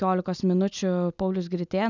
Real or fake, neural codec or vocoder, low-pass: real; none; 7.2 kHz